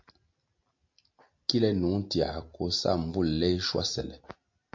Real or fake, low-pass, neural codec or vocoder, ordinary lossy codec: real; 7.2 kHz; none; MP3, 48 kbps